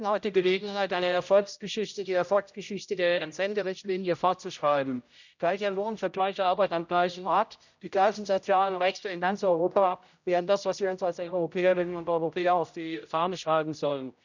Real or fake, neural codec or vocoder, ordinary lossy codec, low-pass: fake; codec, 16 kHz, 0.5 kbps, X-Codec, HuBERT features, trained on general audio; none; 7.2 kHz